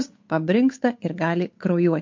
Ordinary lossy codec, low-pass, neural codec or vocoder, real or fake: MP3, 48 kbps; 7.2 kHz; codec, 16 kHz, 8 kbps, FunCodec, trained on Chinese and English, 25 frames a second; fake